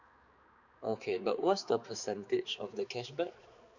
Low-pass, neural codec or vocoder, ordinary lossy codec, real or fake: 7.2 kHz; codec, 16 kHz, 4 kbps, X-Codec, HuBERT features, trained on balanced general audio; Opus, 64 kbps; fake